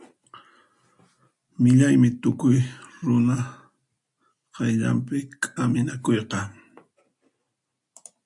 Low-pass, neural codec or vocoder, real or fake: 10.8 kHz; none; real